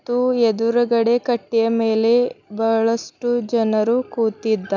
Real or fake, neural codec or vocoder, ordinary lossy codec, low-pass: real; none; none; 7.2 kHz